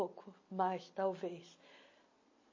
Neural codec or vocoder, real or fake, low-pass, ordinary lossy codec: none; real; 7.2 kHz; MP3, 32 kbps